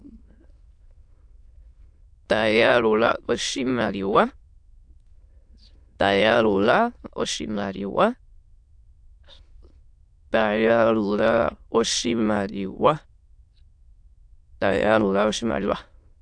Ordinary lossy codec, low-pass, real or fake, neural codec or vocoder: AAC, 64 kbps; 9.9 kHz; fake; autoencoder, 22.05 kHz, a latent of 192 numbers a frame, VITS, trained on many speakers